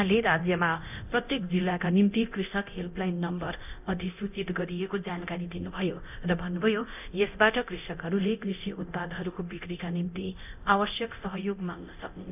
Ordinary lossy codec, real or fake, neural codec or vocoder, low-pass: none; fake; codec, 24 kHz, 0.9 kbps, DualCodec; 3.6 kHz